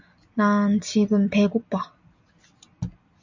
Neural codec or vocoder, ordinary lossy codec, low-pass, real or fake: none; MP3, 64 kbps; 7.2 kHz; real